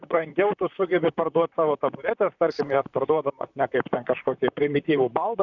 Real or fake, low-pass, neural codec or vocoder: fake; 7.2 kHz; vocoder, 44.1 kHz, 128 mel bands, Pupu-Vocoder